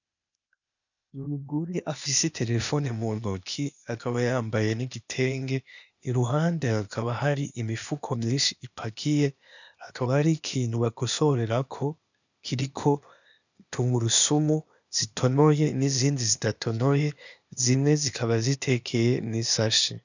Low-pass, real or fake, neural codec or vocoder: 7.2 kHz; fake; codec, 16 kHz, 0.8 kbps, ZipCodec